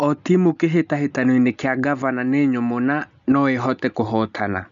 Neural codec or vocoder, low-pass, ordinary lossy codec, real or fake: none; 7.2 kHz; none; real